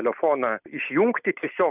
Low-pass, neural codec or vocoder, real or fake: 3.6 kHz; none; real